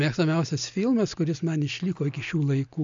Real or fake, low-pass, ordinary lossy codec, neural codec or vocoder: real; 7.2 kHz; AAC, 64 kbps; none